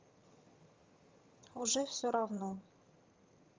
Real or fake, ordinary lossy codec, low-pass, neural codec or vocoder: fake; Opus, 32 kbps; 7.2 kHz; vocoder, 22.05 kHz, 80 mel bands, HiFi-GAN